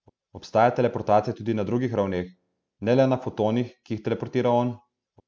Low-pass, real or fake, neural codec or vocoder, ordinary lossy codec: none; real; none; none